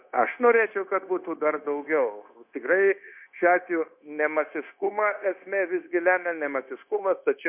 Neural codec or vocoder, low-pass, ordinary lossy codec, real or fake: codec, 16 kHz, 0.9 kbps, LongCat-Audio-Codec; 3.6 kHz; MP3, 32 kbps; fake